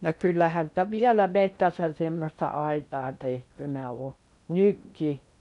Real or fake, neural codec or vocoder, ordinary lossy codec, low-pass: fake; codec, 16 kHz in and 24 kHz out, 0.6 kbps, FocalCodec, streaming, 2048 codes; none; 10.8 kHz